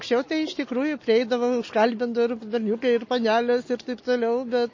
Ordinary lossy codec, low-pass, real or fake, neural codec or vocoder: MP3, 32 kbps; 7.2 kHz; real; none